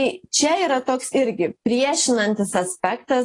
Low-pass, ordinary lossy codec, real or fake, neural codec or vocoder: 10.8 kHz; AAC, 32 kbps; real; none